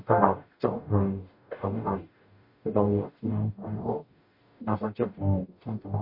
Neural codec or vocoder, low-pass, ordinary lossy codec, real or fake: codec, 44.1 kHz, 0.9 kbps, DAC; 5.4 kHz; MP3, 32 kbps; fake